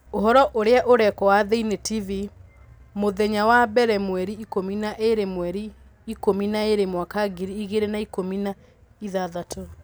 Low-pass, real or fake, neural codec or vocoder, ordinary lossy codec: none; real; none; none